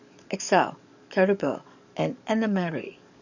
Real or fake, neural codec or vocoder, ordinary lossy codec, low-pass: fake; codec, 44.1 kHz, 7.8 kbps, DAC; none; 7.2 kHz